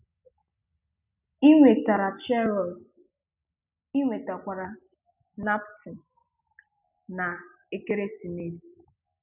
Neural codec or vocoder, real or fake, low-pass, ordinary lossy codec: none; real; 3.6 kHz; none